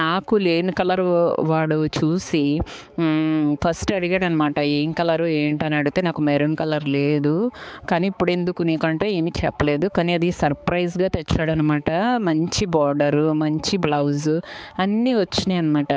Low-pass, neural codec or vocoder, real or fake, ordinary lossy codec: none; codec, 16 kHz, 4 kbps, X-Codec, HuBERT features, trained on balanced general audio; fake; none